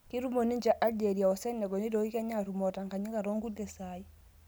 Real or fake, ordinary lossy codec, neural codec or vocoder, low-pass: real; none; none; none